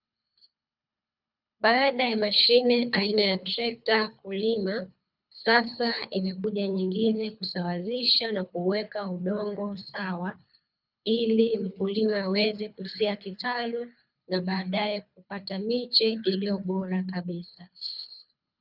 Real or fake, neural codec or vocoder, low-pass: fake; codec, 24 kHz, 3 kbps, HILCodec; 5.4 kHz